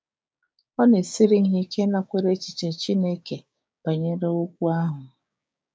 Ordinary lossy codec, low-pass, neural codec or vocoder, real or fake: none; none; codec, 16 kHz, 6 kbps, DAC; fake